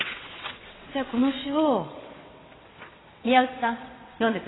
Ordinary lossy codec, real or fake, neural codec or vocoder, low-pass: AAC, 16 kbps; fake; vocoder, 22.05 kHz, 80 mel bands, WaveNeXt; 7.2 kHz